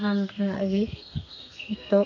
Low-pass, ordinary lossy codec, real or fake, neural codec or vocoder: 7.2 kHz; none; fake; codec, 32 kHz, 1.9 kbps, SNAC